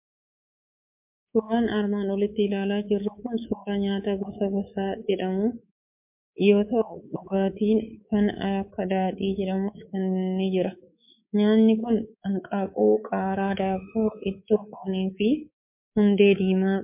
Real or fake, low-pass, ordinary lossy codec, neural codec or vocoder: fake; 3.6 kHz; MP3, 32 kbps; codec, 24 kHz, 3.1 kbps, DualCodec